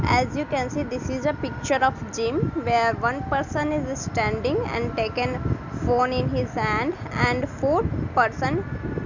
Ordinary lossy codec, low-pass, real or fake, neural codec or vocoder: none; 7.2 kHz; real; none